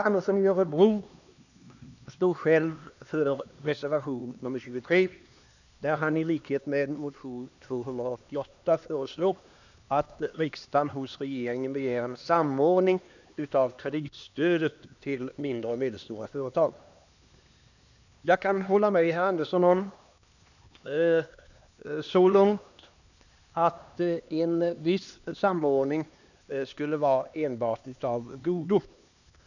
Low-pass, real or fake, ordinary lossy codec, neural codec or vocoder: 7.2 kHz; fake; none; codec, 16 kHz, 2 kbps, X-Codec, HuBERT features, trained on LibriSpeech